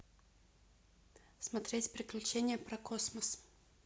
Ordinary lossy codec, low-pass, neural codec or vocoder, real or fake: none; none; none; real